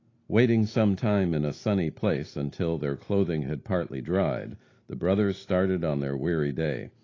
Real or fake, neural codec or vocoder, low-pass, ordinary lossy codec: real; none; 7.2 kHz; AAC, 32 kbps